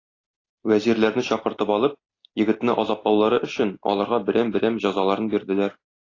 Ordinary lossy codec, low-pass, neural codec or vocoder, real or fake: AAC, 32 kbps; 7.2 kHz; none; real